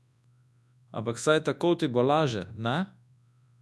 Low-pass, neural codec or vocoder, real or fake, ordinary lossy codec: none; codec, 24 kHz, 0.9 kbps, WavTokenizer, large speech release; fake; none